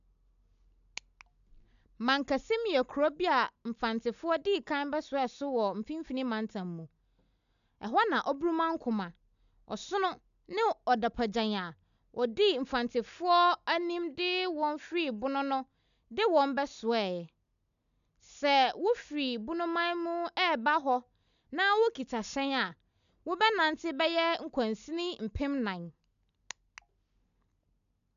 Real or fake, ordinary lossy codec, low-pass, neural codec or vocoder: real; none; 7.2 kHz; none